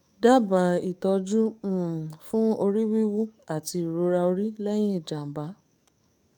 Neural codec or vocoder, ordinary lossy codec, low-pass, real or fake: codec, 44.1 kHz, 7.8 kbps, DAC; none; 19.8 kHz; fake